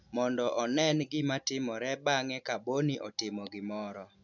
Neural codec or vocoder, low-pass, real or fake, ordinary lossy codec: none; 7.2 kHz; real; none